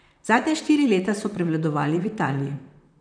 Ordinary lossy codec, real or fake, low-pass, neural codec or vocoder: none; fake; 9.9 kHz; vocoder, 44.1 kHz, 128 mel bands, Pupu-Vocoder